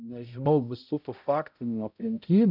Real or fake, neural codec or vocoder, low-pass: fake; codec, 16 kHz, 0.5 kbps, X-Codec, HuBERT features, trained on balanced general audio; 5.4 kHz